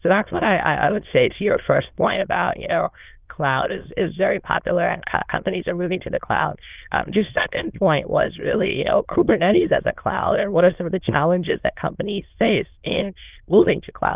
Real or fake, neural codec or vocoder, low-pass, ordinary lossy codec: fake; autoencoder, 22.05 kHz, a latent of 192 numbers a frame, VITS, trained on many speakers; 3.6 kHz; Opus, 32 kbps